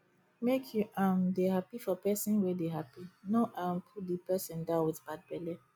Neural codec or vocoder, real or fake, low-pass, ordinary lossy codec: none; real; none; none